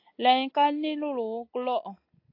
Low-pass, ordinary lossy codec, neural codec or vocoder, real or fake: 5.4 kHz; AAC, 32 kbps; none; real